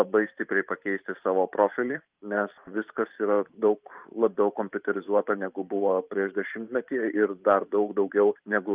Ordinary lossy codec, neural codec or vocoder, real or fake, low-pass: Opus, 24 kbps; vocoder, 44.1 kHz, 128 mel bands every 512 samples, BigVGAN v2; fake; 3.6 kHz